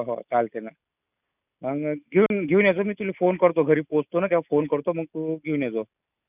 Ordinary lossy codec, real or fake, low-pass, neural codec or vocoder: none; real; 3.6 kHz; none